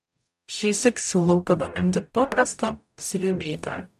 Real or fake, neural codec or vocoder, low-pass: fake; codec, 44.1 kHz, 0.9 kbps, DAC; 14.4 kHz